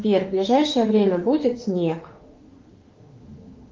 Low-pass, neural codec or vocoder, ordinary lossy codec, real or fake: 7.2 kHz; autoencoder, 48 kHz, 32 numbers a frame, DAC-VAE, trained on Japanese speech; Opus, 32 kbps; fake